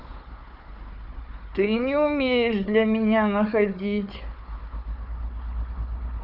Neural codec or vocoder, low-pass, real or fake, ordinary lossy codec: codec, 16 kHz, 4 kbps, FunCodec, trained on Chinese and English, 50 frames a second; 5.4 kHz; fake; none